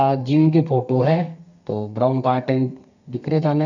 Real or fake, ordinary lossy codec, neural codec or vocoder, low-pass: fake; none; codec, 32 kHz, 1.9 kbps, SNAC; 7.2 kHz